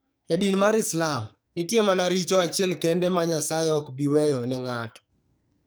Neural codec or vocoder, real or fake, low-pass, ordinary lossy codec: codec, 44.1 kHz, 2.6 kbps, SNAC; fake; none; none